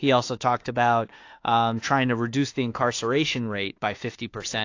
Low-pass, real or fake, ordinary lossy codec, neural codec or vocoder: 7.2 kHz; fake; AAC, 48 kbps; autoencoder, 48 kHz, 32 numbers a frame, DAC-VAE, trained on Japanese speech